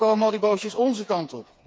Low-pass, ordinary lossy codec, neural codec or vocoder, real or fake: none; none; codec, 16 kHz, 4 kbps, FreqCodec, smaller model; fake